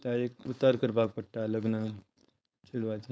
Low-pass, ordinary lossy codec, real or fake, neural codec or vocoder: none; none; fake; codec, 16 kHz, 4.8 kbps, FACodec